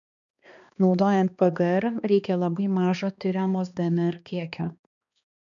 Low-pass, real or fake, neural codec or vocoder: 7.2 kHz; fake; codec, 16 kHz, 2 kbps, X-Codec, HuBERT features, trained on balanced general audio